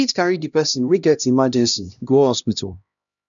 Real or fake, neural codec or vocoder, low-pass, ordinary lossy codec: fake; codec, 16 kHz, 1 kbps, X-Codec, HuBERT features, trained on LibriSpeech; 7.2 kHz; none